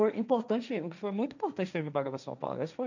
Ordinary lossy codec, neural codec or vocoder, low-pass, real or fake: none; codec, 16 kHz, 1.1 kbps, Voila-Tokenizer; 7.2 kHz; fake